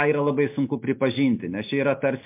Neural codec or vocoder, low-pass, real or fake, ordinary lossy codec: none; 3.6 kHz; real; MP3, 32 kbps